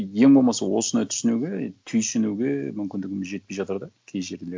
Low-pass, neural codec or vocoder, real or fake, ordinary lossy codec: none; none; real; none